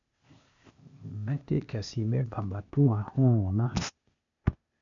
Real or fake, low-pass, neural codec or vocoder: fake; 7.2 kHz; codec, 16 kHz, 0.8 kbps, ZipCodec